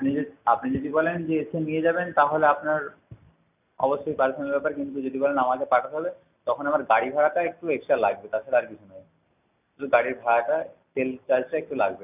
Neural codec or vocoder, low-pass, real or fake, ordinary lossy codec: none; 3.6 kHz; real; none